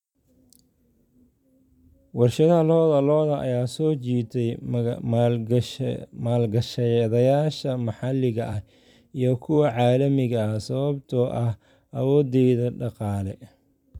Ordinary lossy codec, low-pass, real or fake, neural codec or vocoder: none; 19.8 kHz; fake; vocoder, 44.1 kHz, 128 mel bands every 256 samples, BigVGAN v2